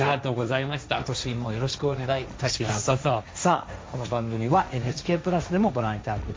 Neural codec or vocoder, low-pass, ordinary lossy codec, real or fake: codec, 16 kHz, 1.1 kbps, Voila-Tokenizer; none; none; fake